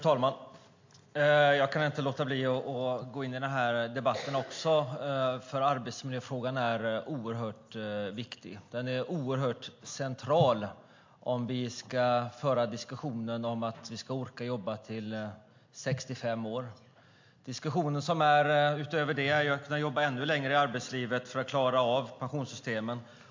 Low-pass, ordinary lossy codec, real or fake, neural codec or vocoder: 7.2 kHz; MP3, 48 kbps; real; none